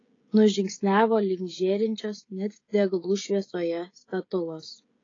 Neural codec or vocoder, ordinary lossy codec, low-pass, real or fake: codec, 16 kHz, 16 kbps, FreqCodec, smaller model; AAC, 32 kbps; 7.2 kHz; fake